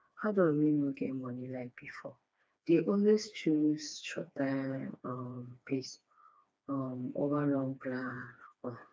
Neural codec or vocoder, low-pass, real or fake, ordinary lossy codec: codec, 16 kHz, 2 kbps, FreqCodec, smaller model; none; fake; none